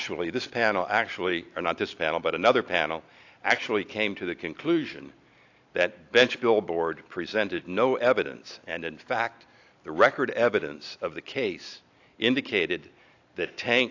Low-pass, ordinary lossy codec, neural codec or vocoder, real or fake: 7.2 kHz; AAC, 48 kbps; none; real